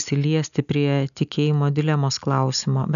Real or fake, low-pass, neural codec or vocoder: real; 7.2 kHz; none